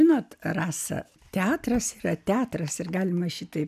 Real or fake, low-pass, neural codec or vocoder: real; 14.4 kHz; none